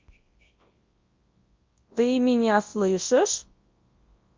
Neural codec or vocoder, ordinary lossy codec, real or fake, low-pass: codec, 24 kHz, 0.9 kbps, WavTokenizer, large speech release; Opus, 24 kbps; fake; 7.2 kHz